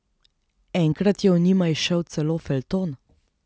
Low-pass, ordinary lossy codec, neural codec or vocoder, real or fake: none; none; none; real